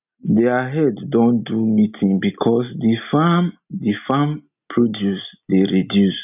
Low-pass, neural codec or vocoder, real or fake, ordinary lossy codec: 3.6 kHz; none; real; none